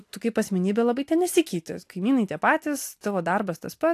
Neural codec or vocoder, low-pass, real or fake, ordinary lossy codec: none; 14.4 kHz; real; AAC, 64 kbps